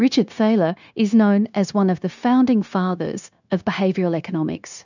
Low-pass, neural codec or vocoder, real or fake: 7.2 kHz; codec, 16 kHz, 0.9 kbps, LongCat-Audio-Codec; fake